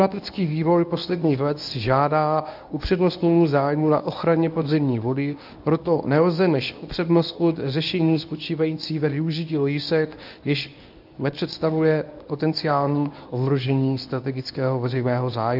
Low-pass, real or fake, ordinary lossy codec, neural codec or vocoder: 5.4 kHz; fake; AAC, 48 kbps; codec, 24 kHz, 0.9 kbps, WavTokenizer, medium speech release version 1